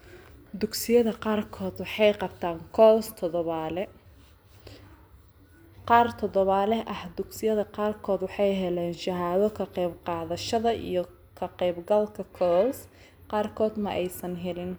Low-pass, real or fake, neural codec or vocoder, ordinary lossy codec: none; real; none; none